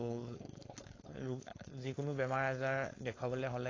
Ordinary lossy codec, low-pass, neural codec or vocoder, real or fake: AAC, 32 kbps; 7.2 kHz; codec, 16 kHz, 4.8 kbps, FACodec; fake